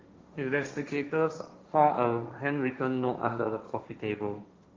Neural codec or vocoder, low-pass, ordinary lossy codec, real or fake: codec, 16 kHz, 1.1 kbps, Voila-Tokenizer; 7.2 kHz; Opus, 32 kbps; fake